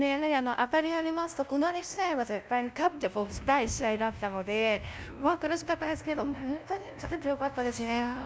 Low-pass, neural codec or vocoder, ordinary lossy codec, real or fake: none; codec, 16 kHz, 0.5 kbps, FunCodec, trained on LibriTTS, 25 frames a second; none; fake